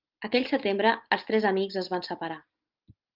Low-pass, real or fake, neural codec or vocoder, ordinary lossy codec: 5.4 kHz; real; none; Opus, 32 kbps